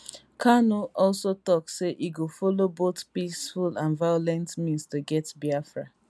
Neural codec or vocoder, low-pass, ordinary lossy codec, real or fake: none; none; none; real